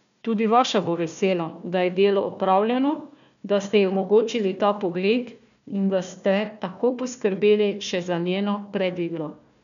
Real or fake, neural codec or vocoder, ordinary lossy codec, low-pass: fake; codec, 16 kHz, 1 kbps, FunCodec, trained on Chinese and English, 50 frames a second; none; 7.2 kHz